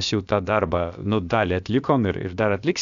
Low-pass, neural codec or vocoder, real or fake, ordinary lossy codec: 7.2 kHz; codec, 16 kHz, about 1 kbps, DyCAST, with the encoder's durations; fake; Opus, 64 kbps